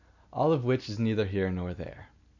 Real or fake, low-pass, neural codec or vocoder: real; 7.2 kHz; none